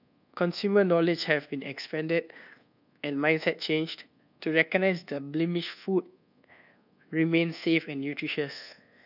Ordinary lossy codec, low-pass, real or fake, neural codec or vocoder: none; 5.4 kHz; fake; codec, 24 kHz, 1.2 kbps, DualCodec